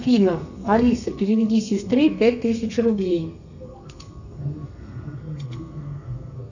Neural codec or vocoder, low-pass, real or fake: codec, 32 kHz, 1.9 kbps, SNAC; 7.2 kHz; fake